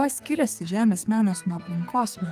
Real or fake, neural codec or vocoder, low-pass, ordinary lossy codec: fake; codec, 32 kHz, 1.9 kbps, SNAC; 14.4 kHz; Opus, 32 kbps